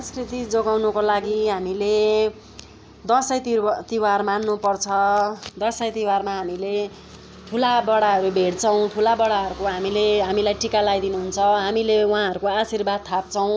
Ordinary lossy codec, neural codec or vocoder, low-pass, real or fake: none; none; none; real